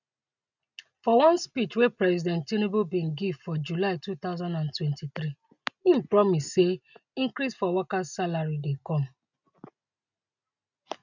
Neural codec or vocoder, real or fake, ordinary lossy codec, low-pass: none; real; none; 7.2 kHz